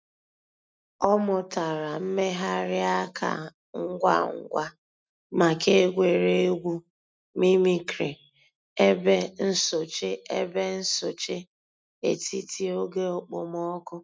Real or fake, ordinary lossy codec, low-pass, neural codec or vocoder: real; none; none; none